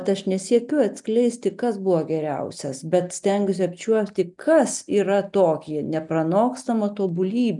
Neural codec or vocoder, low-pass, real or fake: none; 10.8 kHz; real